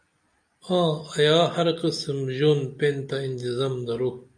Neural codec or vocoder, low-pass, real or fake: none; 9.9 kHz; real